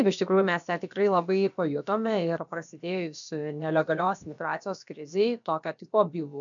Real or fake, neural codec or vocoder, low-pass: fake; codec, 16 kHz, about 1 kbps, DyCAST, with the encoder's durations; 7.2 kHz